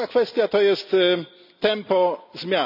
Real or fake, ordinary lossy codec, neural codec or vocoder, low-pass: real; none; none; 5.4 kHz